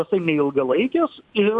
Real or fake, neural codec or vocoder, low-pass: real; none; 10.8 kHz